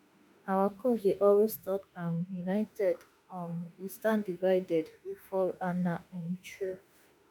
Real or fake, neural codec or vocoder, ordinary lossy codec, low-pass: fake; autoencoder, 48 kHz, 32 numbers a frame, DAC-VAE, trained on Japanese speech; none; none